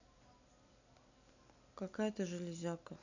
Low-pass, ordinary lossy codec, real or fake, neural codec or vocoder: 7.2 kHz; none; real; none